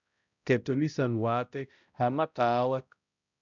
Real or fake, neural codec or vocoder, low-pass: fake; codec, 16 kHz, 0.5 kbps, X-Codec, HuBERT features, trained on balanced general audio; 7.2 kHz